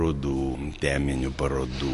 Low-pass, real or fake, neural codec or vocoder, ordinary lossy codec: 14.4 kHz; real; none; MP3, 48 kbps